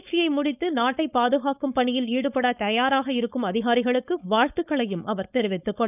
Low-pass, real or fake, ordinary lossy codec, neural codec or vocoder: 3.6 kHz; fake; none; codec, 16 kHz, 4.8 kbps, FACodec